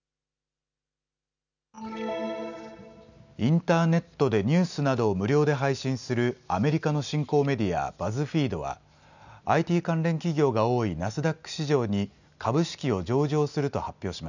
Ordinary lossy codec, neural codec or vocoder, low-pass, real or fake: none; none; 7.2 kHz; real